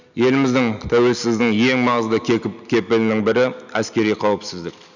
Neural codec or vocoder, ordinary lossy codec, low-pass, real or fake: none; none; 7.2 kHz; real